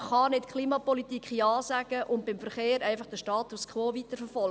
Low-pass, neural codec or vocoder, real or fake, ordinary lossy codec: none; none; real; none